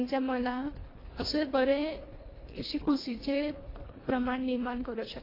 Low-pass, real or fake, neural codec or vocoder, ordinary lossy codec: 5.4 kHz; fake; codec, 24 kHz, 1.5 kbps, HILCodec; AAC, 24 kbps